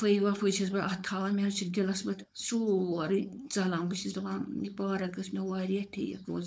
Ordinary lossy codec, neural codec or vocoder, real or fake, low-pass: none; codec, 16 kHz, 4.8 kbps, FACodec; fake; none